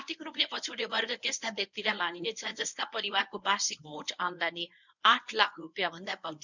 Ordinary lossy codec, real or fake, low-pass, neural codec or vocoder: none; fake; 7.2 kHz; codec, 24 kHz, 0.9 kbps, WavTokenizer, medium speech release version 1